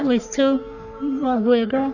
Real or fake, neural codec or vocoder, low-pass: fake; codec, 44.1 kHz, 3.4 kbps, Pupu-Codec; 7.2 kHz